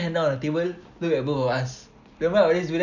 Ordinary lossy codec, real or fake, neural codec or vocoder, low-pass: none; real; none; 7.2 kHz